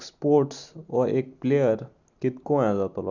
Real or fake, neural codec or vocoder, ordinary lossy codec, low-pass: real; none; none; 7.2 kHz